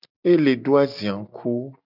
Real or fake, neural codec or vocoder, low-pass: real; none; 5.4 kHz